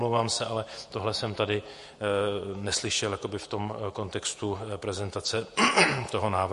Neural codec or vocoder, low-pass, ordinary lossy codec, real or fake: vocoder, 48 kHz, 128 mel bands, Vocos; 14.4 kHz; MP3, 48 kbps; fake